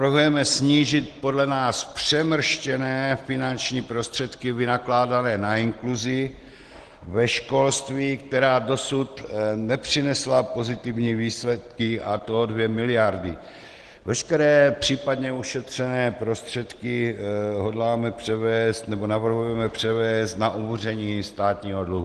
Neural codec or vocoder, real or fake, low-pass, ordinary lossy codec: none; real; 10.8 kHz; Opus, 16 kbps